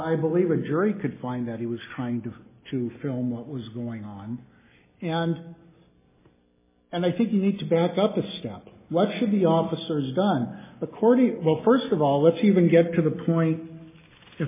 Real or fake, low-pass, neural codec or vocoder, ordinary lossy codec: real; 3.6 kHz; none; MP3, 16 kbps